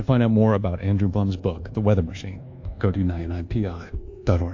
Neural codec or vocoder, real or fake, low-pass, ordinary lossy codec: codec, 24 kHz, 1.2 kbps, DualCodec; fake; 7.2 kHz; AAC, 48 kbps